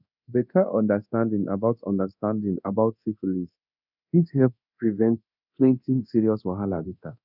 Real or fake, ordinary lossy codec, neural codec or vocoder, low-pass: fake; none; codec, 24 kHz, 0.9 kbps, DualCodec; 5.4 kHz